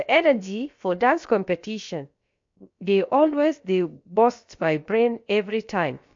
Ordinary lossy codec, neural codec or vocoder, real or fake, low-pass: MP3, 48 kbps; codec, 16 kHz, about 1 kbps, DyCAST, with the encoder's durations; fake; 7.2 kHz